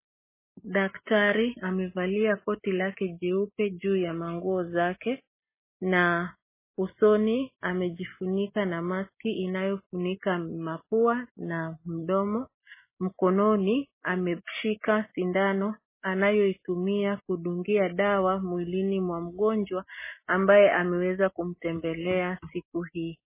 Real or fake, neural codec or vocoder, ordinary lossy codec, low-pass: real; none; MP3, 16 kbps; 3.6 kHz